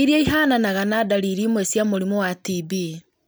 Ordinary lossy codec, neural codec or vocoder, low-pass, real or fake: none; none; none; real